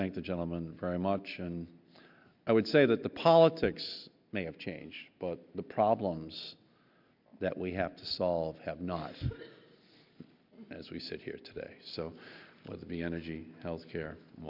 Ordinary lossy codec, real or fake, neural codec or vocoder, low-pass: MP3, 48 kbps; real; none; 5.4 kHz